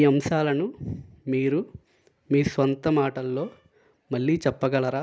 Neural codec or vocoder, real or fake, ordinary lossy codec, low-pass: none; real; none; none